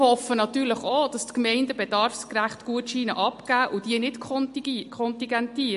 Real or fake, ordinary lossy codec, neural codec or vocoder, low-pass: real; MP3, 48 kbps; none; 14.4 kHz